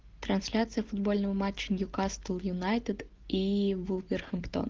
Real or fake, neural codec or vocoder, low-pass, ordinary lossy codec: real; none; 7.2 kHz; Opus, 16 kbps